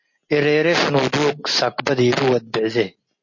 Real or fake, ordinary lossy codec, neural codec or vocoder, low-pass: real; MP3, 32 kbps; none; 7.2 kHz